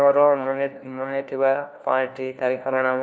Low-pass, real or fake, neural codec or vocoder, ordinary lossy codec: none; fake; codec, 16 kHz, 1 kbps, FunCodec, trained on LibriTTS, 50 frames a second; none